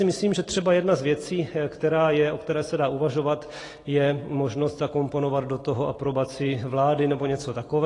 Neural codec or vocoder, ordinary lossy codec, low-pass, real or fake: none; AAC, 32 kbps; 10.8 kHz; real